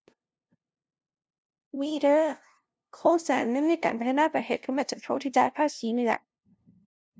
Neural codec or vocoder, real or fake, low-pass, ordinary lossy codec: codec, 16 kHz, 0.5 kbps, FunCodec, trained on LibriTTS, 25 frames a second; fake; none; none